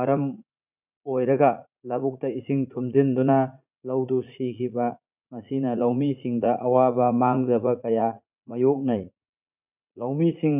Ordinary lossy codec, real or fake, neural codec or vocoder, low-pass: none; fake; vocoder, 44.1 kHz, 80 mel bands, Vocos; 3.6 kHz